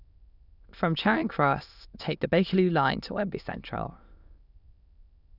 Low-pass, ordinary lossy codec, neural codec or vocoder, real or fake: 5.4 kHz; none; autoencoder, 22.05 kHz, a latent of 192 numbers a frame, VITS, trained on many speakers; fake